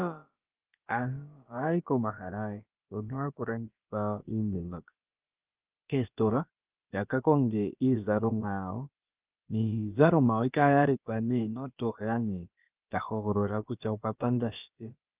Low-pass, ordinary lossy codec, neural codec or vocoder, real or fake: 3.6 kHz; Opus, 24 kbps; codec, 16 kHz, about 1 kbps, DyCAST, with the encoder's durations; fake